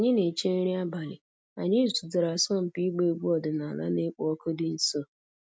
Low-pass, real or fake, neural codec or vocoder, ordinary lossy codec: none; real; none; none